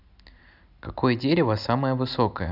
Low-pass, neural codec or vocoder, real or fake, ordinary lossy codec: 5.4 kHz; none; real; none